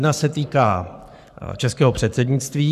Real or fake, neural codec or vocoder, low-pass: fake; codec, 44.1 kHz, 7.8 kbps, Pupu-Codec; 14.4 kHz